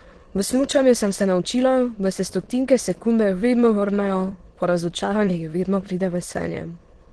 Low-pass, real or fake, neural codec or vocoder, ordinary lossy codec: 9.9 kHz; fake; autoencoder, 22.05 kHz, a latent of 192 numbers a frame, VITS, trained on many speakers; Opus, 16 kbps